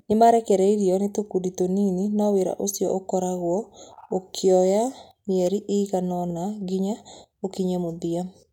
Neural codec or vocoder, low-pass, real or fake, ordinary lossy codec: none; 19.8 kHz; real; none